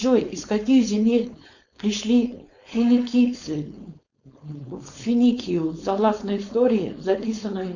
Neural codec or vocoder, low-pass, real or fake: codec, 16 kHz, 4.8 kbps, FACodec; 7.2 kHz; fake